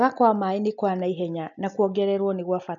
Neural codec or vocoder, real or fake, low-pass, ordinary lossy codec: none; real; 7.2 kHz; none